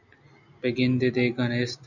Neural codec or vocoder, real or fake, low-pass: none; real; 7.2 kHz